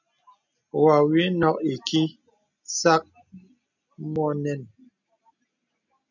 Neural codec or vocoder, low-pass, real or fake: none; 7.2 kHz; real